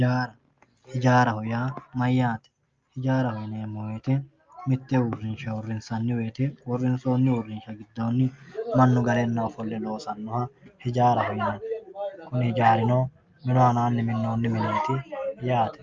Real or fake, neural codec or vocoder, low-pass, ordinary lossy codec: real; none; 7.2 kHz; Opus, 24 kbps